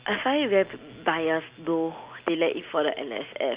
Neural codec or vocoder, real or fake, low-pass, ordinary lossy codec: none; real; 3.6 kHz; Opus, 64 kbps